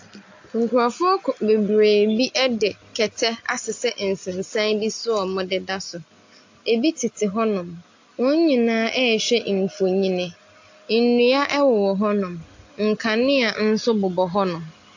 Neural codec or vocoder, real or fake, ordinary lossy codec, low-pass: none; real; AAC, 48 kbps; 7.2 kHz